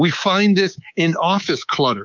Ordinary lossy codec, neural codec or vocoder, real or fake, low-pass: MP3, 64 kbps; codec, 24 kHz, 3.1 kbps, DualCodec; fake; 7.2 kHz